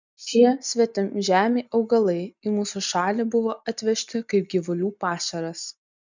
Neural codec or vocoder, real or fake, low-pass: none; real; 7.2 kHz